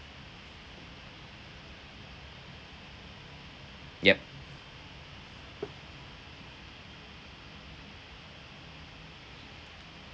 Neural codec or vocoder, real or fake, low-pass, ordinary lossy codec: none; real; none; none